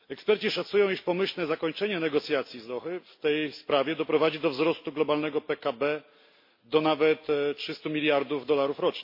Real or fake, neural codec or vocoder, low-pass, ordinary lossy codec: real; none; 5.4 kHz; MP3, 32 kbps